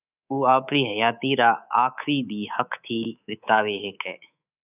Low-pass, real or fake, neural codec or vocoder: 3.6 kHz; fake; codec, 24 kHz, 3.1 kbps, DualCodec